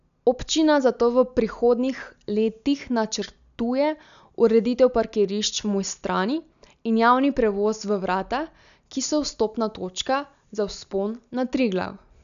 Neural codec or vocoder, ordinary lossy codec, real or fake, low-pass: none; none; real; 7.2 kHz